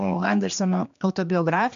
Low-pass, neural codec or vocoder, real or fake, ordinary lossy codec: 7.2 kHz; codec, 16 kHz, 2 kbps, X-Codec, HuBERT features, trained on general audio; fake; MP3, 64 kbps